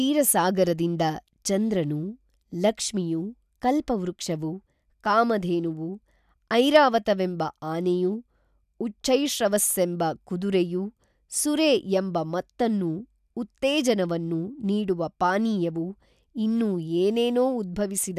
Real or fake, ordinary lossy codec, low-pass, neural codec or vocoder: real; none; 14.4 kHz; none